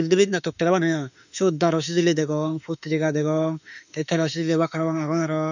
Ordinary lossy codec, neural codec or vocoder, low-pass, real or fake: none; autoencoder, 48 kHz, 32 numbers a frame, DAC-VAE, trained on Japanese speech; 7.2 kHz; fake